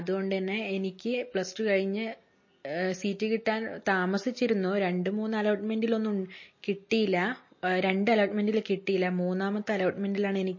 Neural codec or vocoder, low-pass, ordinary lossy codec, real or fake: none; 7.2 kHz; MP3, 32 kbps; real